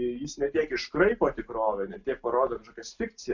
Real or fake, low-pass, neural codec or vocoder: real; 7.2 kHz; none